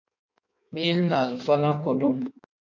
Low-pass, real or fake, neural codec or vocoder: 7.2 kHz; fake; codec, 16 kHz in and 24 kHz out, 1.1 kbps, FireRedTTS-2 codec